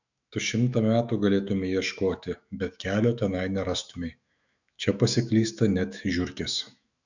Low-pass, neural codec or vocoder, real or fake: 7.2 kHz; autoencoder, 48 kHz, 128 numbers a frame, DAC-VAE, trained on Japanese speech; fake